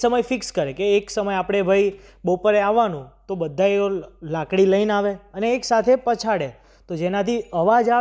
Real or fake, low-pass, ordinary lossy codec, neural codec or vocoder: real; none; none; none